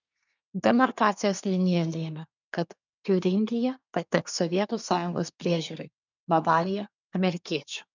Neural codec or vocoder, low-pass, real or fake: codec, 24 kHz, 1 kbps, SNAC; 7.2 kHz; fake